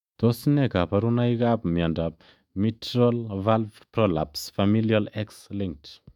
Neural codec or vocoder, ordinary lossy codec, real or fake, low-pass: autoencoder, 48 kHz, 128 numbers a frame, DAC-VAE, trained on Japanese speech; MP3, 96 kbps; fake; 19.8 kHz